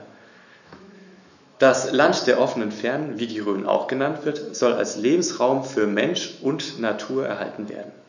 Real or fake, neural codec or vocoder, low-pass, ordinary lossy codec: fake; autoencoder, 48 kHz, 128 numbers a frame, DAC-VAE, trained on Japanese speech; 7.2 kHz; none